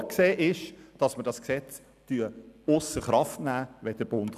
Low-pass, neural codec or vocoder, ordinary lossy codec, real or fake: 14.4 kHz; none; none; real